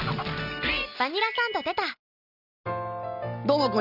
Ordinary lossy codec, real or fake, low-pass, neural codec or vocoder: none; real; 5.4 kHz; none